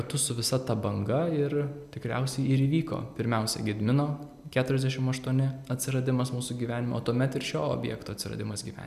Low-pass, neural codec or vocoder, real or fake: 14.4 kHz; none; real